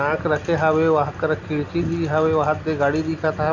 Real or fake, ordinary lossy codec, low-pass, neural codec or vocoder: real; none; 7.2 kHz; none